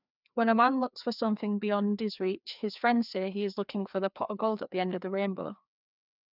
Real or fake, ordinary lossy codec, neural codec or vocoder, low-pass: fake; none; codec, 16 kHz, 2 kbps, FreqCodec, larger model; 5.4 kHz